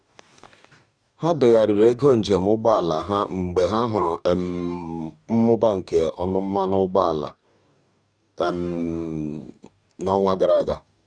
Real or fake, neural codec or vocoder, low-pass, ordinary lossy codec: fake; codec, 44.1 kHz, 2.6 kbps, DAC; 9.9 kHz; none